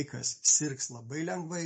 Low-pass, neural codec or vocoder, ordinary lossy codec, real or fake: 9.9 kHz; none; MP3, 32 kbps; real